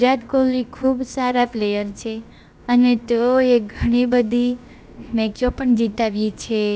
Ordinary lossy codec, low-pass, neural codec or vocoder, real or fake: none; none; codec, 16 kHz, about 1 kbps, DyCAST, with the encoder's durations; fake